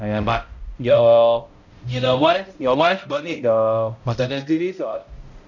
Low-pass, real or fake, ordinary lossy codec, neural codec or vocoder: 7.2 kHz; fake; none; codec, 16 kHz, 0.5 kbps, X-Codec, HuBERT features, trained on balanced general audio